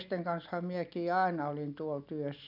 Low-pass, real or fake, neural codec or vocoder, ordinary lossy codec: 5.4 kHz; real; none; none